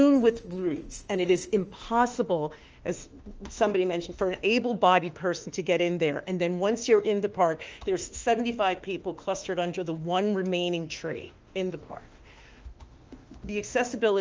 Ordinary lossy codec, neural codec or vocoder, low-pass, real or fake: Opus, 24 kbps; autoencoder, 48 kHz, 32 numbers a frame, DAC-VAE, trained on Japanese speech; 7.2 kHz; fake